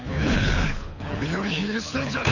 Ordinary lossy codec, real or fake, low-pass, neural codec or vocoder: none; fake; 7.2 kHz; codec, 24 kHz, 6 kbps, HILCodec